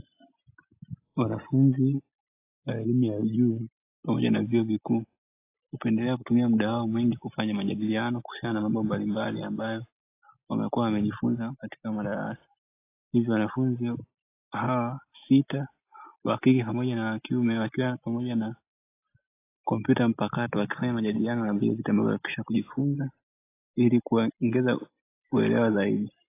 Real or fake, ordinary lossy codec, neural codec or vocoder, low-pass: real; AAC, 24 kbps; none; 3.6 kHz